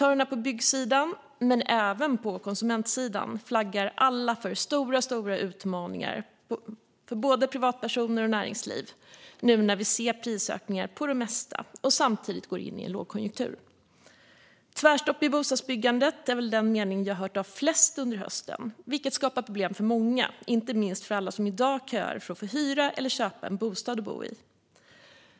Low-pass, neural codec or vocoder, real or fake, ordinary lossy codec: none; none; real; none